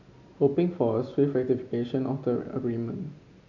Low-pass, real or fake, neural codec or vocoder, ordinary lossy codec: 7.2 kHz; real; none; none